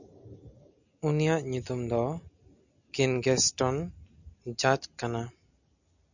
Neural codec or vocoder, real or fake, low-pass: none; real; 7.2 kHz